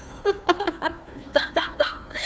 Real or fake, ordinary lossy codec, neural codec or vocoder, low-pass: fake; none; codec, 16 kHz, 1 kbps, FunCodec, trained on Chinese and English, 50 frames a second; none